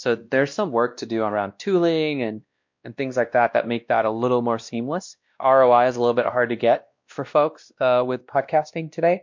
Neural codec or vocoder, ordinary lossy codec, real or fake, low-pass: codec, 16 kHz, 1 kbps, X-Codec, WavLM features, trained on Multilingual LibriSpeech; MP3, 48 kbps; fake; 7.2 kHz